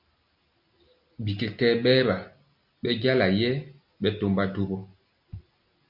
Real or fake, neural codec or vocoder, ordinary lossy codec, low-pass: real; none; MP3, 48 kbps; 5.4 kHz